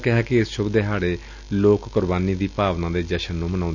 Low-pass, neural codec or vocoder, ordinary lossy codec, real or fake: 7.2 kHz; none; none; real